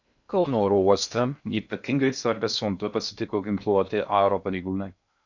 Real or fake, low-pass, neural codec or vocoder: fake; 7.2 kHz; codec, 16 kHz in and 24 kHz out, 0.6 kbps, FocalCodec, streaming, 2048 codes